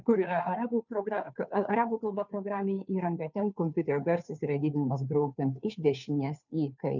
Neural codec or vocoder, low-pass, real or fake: codec, 16 kHz, 2 kbps, FunCodec, trained on Chinese and English, 25 frames a second; 7.2 kHz; fake